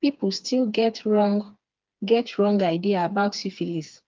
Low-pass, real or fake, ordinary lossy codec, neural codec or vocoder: 7.2 kHz; fake; Opus, 32 kbps; codec, 16 kHz, 4 kbps, FreqCodec, smaller model